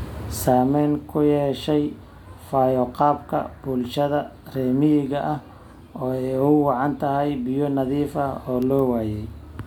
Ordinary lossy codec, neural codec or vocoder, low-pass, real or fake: none; none; 19.8 kHz; real